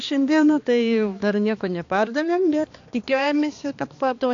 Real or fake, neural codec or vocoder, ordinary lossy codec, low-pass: fake; codec, 16 kHz, 2 kbps, X-Codec, HuBERT features, trained on balanced general audio; AAC, 48 kbps; 7.2 kHz